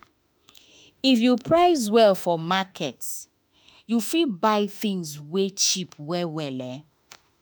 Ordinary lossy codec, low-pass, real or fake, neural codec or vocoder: none; none; fake; autoencoder, 48 kHz, 32 numbers a frame, DAC-VAE, trained on Japanese speech